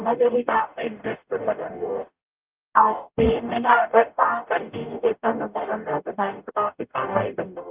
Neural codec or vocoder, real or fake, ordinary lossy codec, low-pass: codec, 44.1 kHz, 0.9 kbps, DAC; fake; Opus, 24 kbps; 3.6 kHz